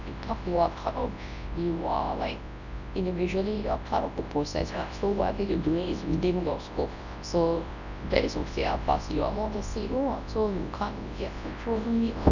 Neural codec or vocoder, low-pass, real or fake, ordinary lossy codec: codec, 24 kHz, 0.9 kbps, WavTokenizer, large speech release; 7.2 kHz; fake; none